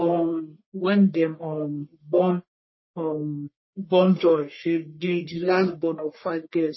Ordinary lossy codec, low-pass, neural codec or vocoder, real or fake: MP3, 24 kbps; 7.2 kHz; codec, 44.1 kHz, 1.7 kbps, Pupu-Codec; fake